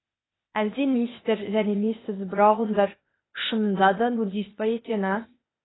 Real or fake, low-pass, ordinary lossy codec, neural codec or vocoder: fake; 7.2 kHz; AAC, 16 kbps; codec, 16 kHz, 0.8 kbps, ZipCodec